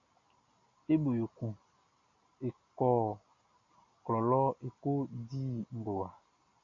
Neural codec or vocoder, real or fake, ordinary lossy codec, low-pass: none; real; AAC, 48 kbps; 7.2 kHz